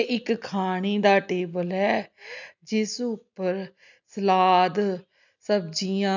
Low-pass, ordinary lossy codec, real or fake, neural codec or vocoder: 7.2 kHz; none; real; none